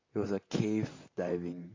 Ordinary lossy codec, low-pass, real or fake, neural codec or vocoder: AAC, 32 kbps; 7.2 kHz; fake; vocoder, 44.1 kHz, 128 mel bands, Pupu-Vocoder